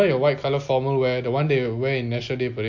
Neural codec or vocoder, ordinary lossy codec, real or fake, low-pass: none; MP3, 48 kbps; real; 7.2 kHz